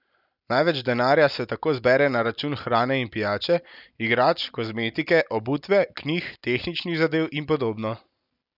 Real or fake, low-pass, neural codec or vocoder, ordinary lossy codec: real; 5.4 kHz; none; none